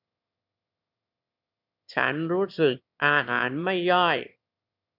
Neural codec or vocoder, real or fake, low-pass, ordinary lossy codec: autoencoder, 22.05 kHz, a latent of 192 numbers a frame, VITS, trained on one speaker; fake; 5.4 kHz; none